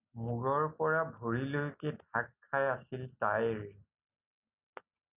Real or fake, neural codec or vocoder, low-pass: real; none; 3.6 kHz